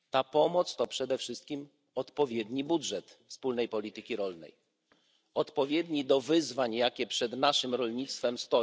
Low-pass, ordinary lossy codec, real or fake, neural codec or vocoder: none; none; real; none